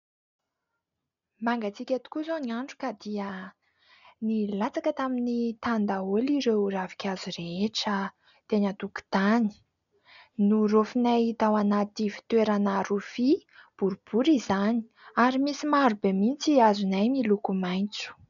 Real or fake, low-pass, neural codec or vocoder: real; 7.2 kHz; none